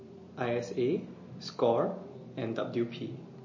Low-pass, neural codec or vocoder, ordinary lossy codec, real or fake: 7.2 kHz; none; MP3, 32 kbps; real